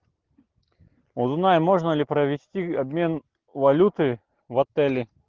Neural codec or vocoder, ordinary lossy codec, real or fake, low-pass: none; Opus, 16 kbps; real; 7.2 kHz